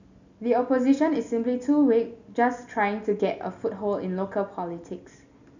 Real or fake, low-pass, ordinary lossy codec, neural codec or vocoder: real; 7.2 kHz; none; none